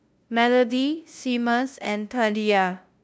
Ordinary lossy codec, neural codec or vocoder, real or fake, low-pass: none; codec, 16 kHz, 0.5 kbps, FunCodec, trained on LibriTTS, 25 frames a second; fake; none